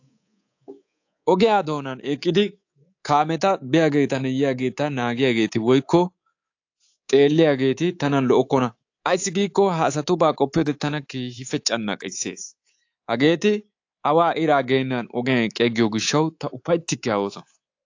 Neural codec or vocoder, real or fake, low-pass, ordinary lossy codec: codec, 24 kHz, 3.1 kbps, DualCodec; fake; 7.2 kHz; AAC, 48 kbps